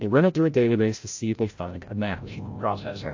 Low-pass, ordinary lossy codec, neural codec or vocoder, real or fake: 7.2 kHz; AAC, 48 kbps; codec, 16 kHz, 0.5 kbps, FreqCodec, larger model; fake